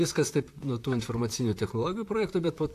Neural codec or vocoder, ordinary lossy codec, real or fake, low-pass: vocoder, 44.1 kHz, 128 mel bands, Pupu-Vocoder; AAC, 64 kbps; fake; 14.4 kHz